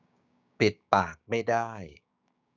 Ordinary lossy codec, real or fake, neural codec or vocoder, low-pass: none; fake; codec, 16 kHz, 6 kbps, DAC; 7.2 kHz